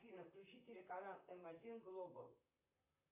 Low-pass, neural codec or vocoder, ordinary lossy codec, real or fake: 3.6 kHz; vocoder, 44.1 kHz, 128 mel bands, Pupu-Vocoder; MP3, 32 kbps; fake